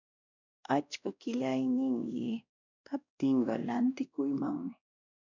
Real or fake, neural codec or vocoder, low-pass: fake; codec, 16 kHz, 1 kbps, X-Codec, WavLM features, trained on Multilingual LibriSpeech; 7.2 kHz